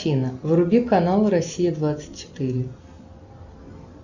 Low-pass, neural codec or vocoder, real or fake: 7.2 kHz; none; real